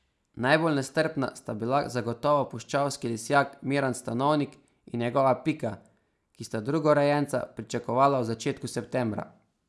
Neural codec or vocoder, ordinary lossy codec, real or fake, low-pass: none; none; real; none